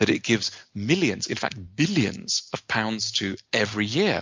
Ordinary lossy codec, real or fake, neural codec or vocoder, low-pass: MP3, 64 kbps; real; none; 7.2 kHz